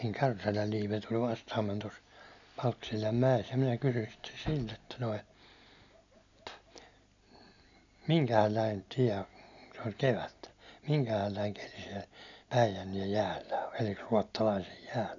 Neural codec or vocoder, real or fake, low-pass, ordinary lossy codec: none; real; 7.2 kHz; none